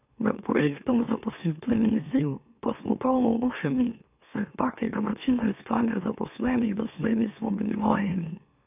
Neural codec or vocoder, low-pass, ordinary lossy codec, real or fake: autoencoder, 44.1 kHz, a latent of 192 numbers a frame, MeloTTS; 3.6 kHz; none; fake